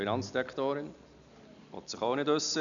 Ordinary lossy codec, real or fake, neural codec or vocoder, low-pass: MP3, 96 kbps; real; none; 7.2 kHz